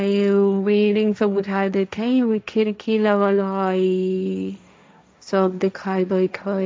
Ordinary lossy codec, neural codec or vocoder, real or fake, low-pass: none; codec, 16 kHz, 1.1 kbps, Voila-Tokenizer; fake; none